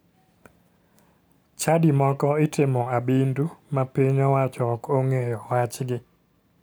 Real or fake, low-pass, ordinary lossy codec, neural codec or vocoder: real; none; none; none